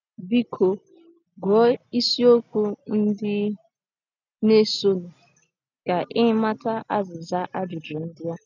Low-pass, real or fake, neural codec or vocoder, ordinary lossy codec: 7.2 kHz; real; none; none